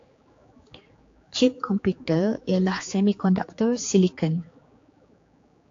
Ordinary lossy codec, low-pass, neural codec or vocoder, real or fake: AAC, 48 kbps; 7.2 kHz; codec, 16 kHz, 4 kbps, X-Codec, HuBERT features, trained on general audio; fake